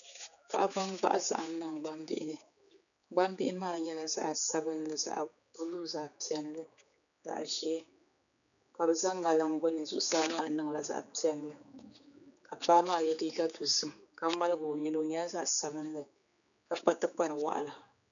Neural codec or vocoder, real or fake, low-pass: codec, 16 kHz, 4 kbps, X-Codec, HuBERT features, trained on general audio; fake; 7.2 kHz